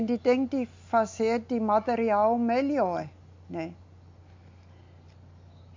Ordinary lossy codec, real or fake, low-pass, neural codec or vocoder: MP3, 48 kbps; real; 7.2 kHz; none